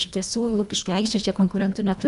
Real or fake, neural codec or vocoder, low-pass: fake; codec, 24 kHz, 1.5 kbps, HILCodec; 10.8 kHz